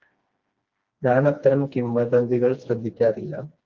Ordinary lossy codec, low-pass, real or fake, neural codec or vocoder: Opus, 24 kbps; 7.2 kHz; fake; codec, 16 kHz, 2 kbps, FreqCodec, smaller model